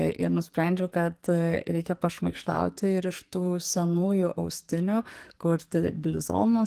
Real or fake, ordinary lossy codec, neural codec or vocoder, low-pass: fake; Opus, 24 kbps; codec, 44.1 kHz, 2.6 kbps, SNAC; 14.4 kHz